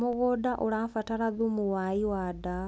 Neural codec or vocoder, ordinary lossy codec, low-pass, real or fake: none; none; none; real